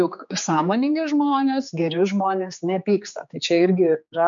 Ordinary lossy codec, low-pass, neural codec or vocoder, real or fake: MP3, 64 kbps; 7.2 kHz; codec, 16 kHz, 4 kbps, X-Codec, HuBERT features, trained on general audio; fake